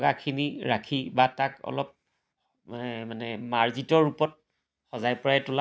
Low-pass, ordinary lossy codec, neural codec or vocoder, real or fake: none; none; none; real